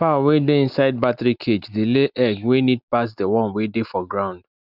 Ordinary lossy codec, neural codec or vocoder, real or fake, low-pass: none; none; real; 5.4 kHz